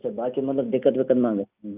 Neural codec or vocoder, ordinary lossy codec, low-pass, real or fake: none; none; 3.6 kHz; real